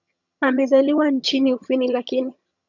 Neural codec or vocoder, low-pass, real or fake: vocoder, 22.05 kHz, 80 mel bands, HiFi-GAN; 7.2 kHz; fake